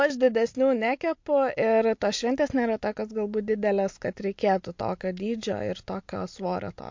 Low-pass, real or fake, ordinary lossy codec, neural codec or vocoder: 7.2 kHz; real; MP3, 48 kbps; none